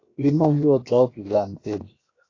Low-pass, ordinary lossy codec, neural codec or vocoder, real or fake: 7.2 kHz; AAC, 32 kbps; codec, 16 kHz, 0.7 kbps, FocalCodec; fake